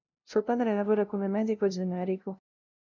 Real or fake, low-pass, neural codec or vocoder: fake; 7.2 kHz; codec, 16 kHz, 0.5 kbps, FunCodec, trained on LibriTTS, 25 frames a second